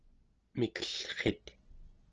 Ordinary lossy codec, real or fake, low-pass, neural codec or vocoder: Opus, 16 kbps; real; 7.2 kHz; none